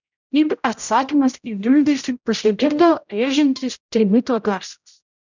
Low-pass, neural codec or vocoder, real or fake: 7.2 kHz; codec, 16 kHz, 0.5 kbps, X-Codec, HuBERT features, trained on general audio; fake